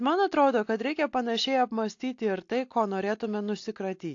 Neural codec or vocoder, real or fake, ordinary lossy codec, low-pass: none; real; AAC, 48 kbps; 7.2 kHz